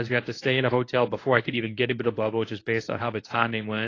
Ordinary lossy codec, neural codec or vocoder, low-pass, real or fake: AAC, 32 kbps; codec, 24 kHz, 0.9 kbps, WavTokenizer, medium speech release version 1; 7.2 kHz; fake